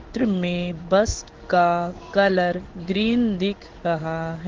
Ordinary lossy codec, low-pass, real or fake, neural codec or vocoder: Opus, 16 kbps; 7.2 kHz; fake; codec, 16 kHz in and 24 kHz out, 1 kbps, XY-Tokenizer